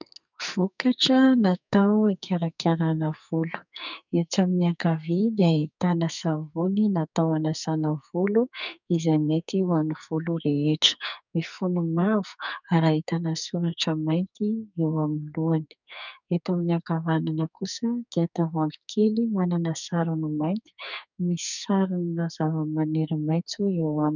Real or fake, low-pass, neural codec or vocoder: fake; 7.2 kHz; codec, 44.1 kHz, 2.6 kbps, SNAC